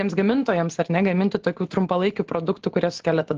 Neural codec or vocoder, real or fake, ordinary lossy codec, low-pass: none; real; Opus, 16 kbps; 7.2 kHz